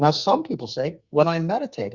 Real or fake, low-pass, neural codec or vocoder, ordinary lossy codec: fake; 7.2 kHz; codec, 44.1 kHz, 2.6 kbps, SNAC; Opus, 64 kbps